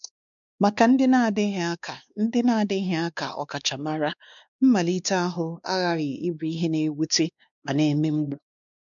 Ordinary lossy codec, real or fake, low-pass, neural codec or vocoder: none; fake; 7.2 kHz; codec, 16 kHz, 2 kbps, X-Codec, WavLM features, trained on Multilingual LibriSpeech